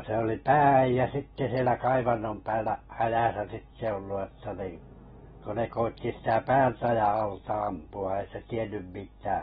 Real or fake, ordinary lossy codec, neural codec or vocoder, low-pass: real; AAC, 16 kbps; none; 10.8 kHz